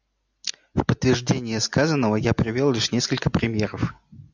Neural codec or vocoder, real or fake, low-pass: none; real; 7.2 kHz